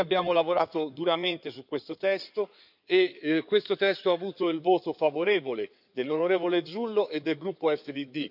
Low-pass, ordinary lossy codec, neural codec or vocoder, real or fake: 5.4 kHz; none; codec, 16 kHz in and 24 kHz out, 2.2 kbps, FireRedTTS-2 codec; fake